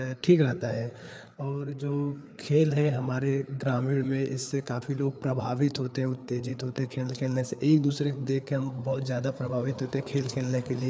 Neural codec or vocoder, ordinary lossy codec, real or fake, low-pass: codec, 16 kHz, 4 kbps, FreqCodec, larger model; none; fake; none